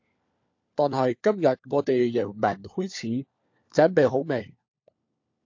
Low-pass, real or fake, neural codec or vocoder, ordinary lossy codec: 7.2 kHz; fake; codec, 16 kHz, 4 kbps, FunCodec, trained on LibriTTS, 50 frames a second; AAC, 48 kbps